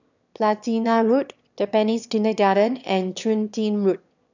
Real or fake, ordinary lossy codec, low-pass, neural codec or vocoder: fake; none; 7.2 kHz; autoencoder, 22.05 kHz, a latent of 192 numbers a frame, VITS, trained on one speaker